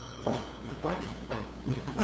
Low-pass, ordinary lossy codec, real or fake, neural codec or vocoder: none; none; fake; codec, 16 kHz, 2 kbps, FunCodec, trained on LibriTTS, 25 frames a second